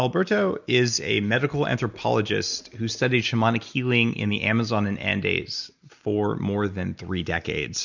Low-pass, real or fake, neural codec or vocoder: 7.2 kHz; real; none